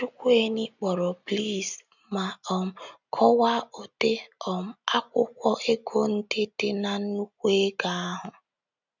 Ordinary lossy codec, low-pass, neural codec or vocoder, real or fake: none; 7.2 kHz; none; real